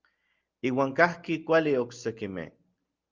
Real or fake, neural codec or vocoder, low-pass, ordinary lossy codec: real; none; 7.2 kHz; Opus, 32 kbps